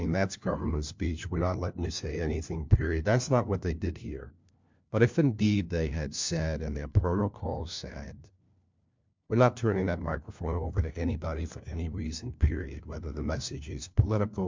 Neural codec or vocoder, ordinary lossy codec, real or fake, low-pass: codec, 16 kHz, 1 kbps, FunCodec, trained on LibriTTS, 50 frames a second; MP3, 64 kbps; fake; 7.2 kHz